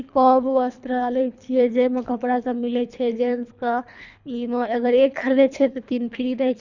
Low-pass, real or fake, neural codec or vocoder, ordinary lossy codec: 7.2 kHz; fake; codec, 24 kHz, 3 kbps, HILCodec; none